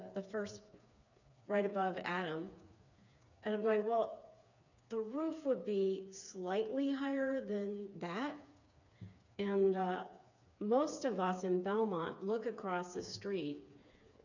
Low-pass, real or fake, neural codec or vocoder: 7.2 kHz; fake; codec, 16 kHz, 4 kbps, FreqCodec, smaller model